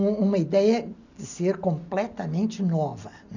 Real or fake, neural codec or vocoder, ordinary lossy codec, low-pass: real; none; none; 7.2 kHz